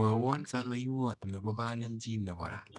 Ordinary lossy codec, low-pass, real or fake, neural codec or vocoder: none; 10.8 kHz; fake; codec, 24 kHz, 0.9 kbps, WavTokenizer, medium music audio release